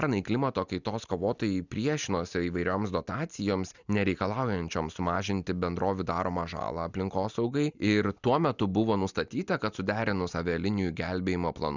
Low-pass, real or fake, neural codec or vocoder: 7.2 kHz; real; none